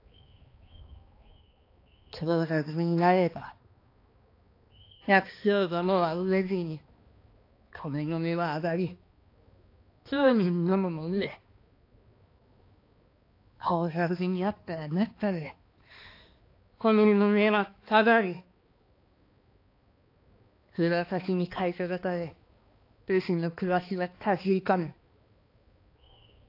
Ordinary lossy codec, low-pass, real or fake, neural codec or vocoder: AAC, 32 kbps; 5.4 kHz; fake; codec, 16 kHz, 2 kbps, X-Codec, HuBERT features, trained on balanced general audio